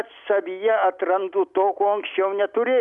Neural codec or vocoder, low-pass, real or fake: none; 10.8 kHz; real